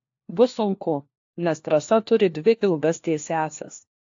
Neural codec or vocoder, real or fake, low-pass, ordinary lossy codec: codec, 16 kHz, 1 kbps, FunCodec, trained on LibriTTS, 50 frames a second; fake; 7.2 kHz; AAC, 48 kbps